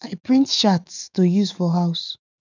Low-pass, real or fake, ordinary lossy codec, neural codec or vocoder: 7.2 kHz; fake; none; autoencoder, 48 kHz, 128 numbers a frame, DAC-VAE, trained on Japanese speech